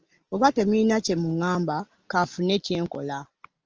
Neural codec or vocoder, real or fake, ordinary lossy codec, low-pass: none; real; Opus, 24 kbps; 7.2 kHz